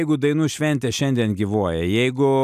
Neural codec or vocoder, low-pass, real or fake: none; 14.4 kHz; real